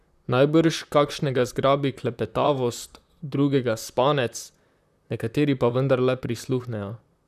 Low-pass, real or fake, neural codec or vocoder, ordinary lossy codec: 14.4 kHz; fake; vocoder, 44.1 kHz, 128 mel bands, Pupu-Vocoder; none